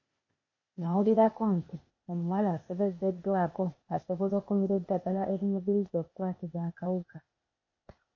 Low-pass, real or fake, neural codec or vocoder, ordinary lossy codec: 7.2 kHz; fake; codec, 16 kHz, 0.8 kbps, ZipCodec; MP3, 32 kbps